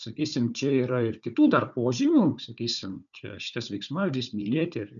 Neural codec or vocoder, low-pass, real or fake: codec, 16 kHz, 4 kbps, FunCodec, trained on Chinese and English, 50 frames a second; 7.2 kHz; fake